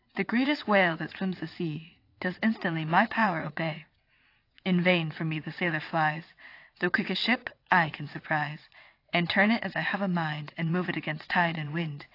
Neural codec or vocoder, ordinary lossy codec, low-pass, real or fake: vocoder, 22.05 kHz, 80 mel bands, WaveNeXt; AAC, 32 kbps; 5.4 kHz; fake